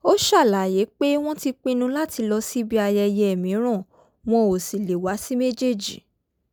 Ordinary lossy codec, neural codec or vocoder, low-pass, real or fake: none; none; none; real